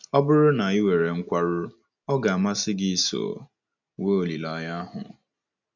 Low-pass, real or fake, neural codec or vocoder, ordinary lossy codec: 7.2 kHz; real; none; none